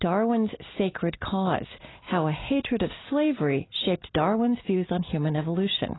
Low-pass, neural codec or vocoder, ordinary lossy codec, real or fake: 7.2 kHz; none; AAC, 16 kbps; real